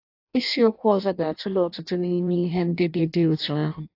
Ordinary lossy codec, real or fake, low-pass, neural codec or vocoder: AAC, 32 kbps; fake; 5.4 kHz; codec, 16 kHz in and 24 kHz out, 0.6 kbps, FireRedTTS-2 codec